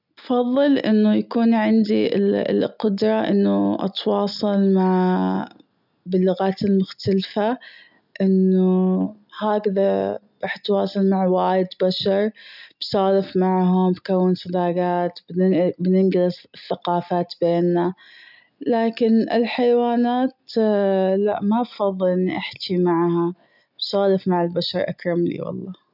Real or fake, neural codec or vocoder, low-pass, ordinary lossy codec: real; none; 5.4 kHz; none